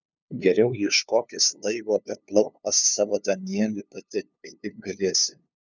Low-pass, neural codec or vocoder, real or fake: 7.2 kHz; codec, 16 kHz, 2 kbps, FunCodec, trained on LibriTTS, 25 frames a second; fake